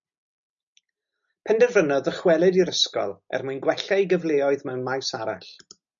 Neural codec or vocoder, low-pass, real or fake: none; 7.2 kHz; real